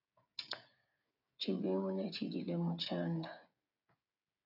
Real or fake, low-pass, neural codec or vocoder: fake; 5.4 kHz; codec, 16 kHz, 16 kbps, FreqCodec, smaller model